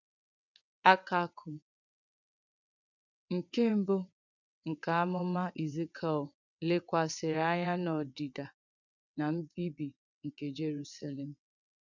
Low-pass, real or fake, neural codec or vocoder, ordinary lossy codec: 7.2 kHz; fake; vocoder, 22.05 kHz, 80 mel bands, WaveNeXt; none